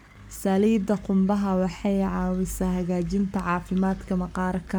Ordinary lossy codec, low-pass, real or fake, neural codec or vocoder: none; none; fake; codec, 44.1 kHz, 7.8 kbps, Pupu-Codec